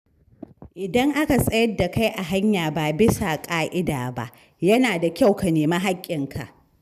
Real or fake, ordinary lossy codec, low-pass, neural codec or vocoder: real; none; 14.4 kHz; none